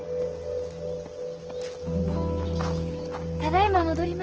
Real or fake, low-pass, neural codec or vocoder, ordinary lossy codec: real; 7.2 kHz; none; Opus, 16 kbps